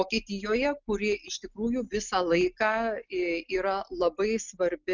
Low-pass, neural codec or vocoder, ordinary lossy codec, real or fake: 7.2 kHz; none; Opus, 64 kbps; real